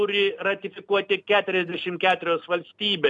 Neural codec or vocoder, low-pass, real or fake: none; 10.8 kHz; real